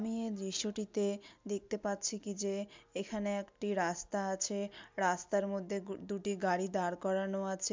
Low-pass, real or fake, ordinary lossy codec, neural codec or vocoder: 7.2 kHz; real; MP3, 64 kbps; none